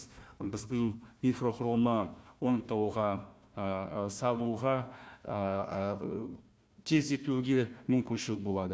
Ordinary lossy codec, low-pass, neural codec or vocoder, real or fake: none; none; codec, 16 kHz, 1 kbps, FunCodec, trained on Chinese and English, 50 frames a second; fake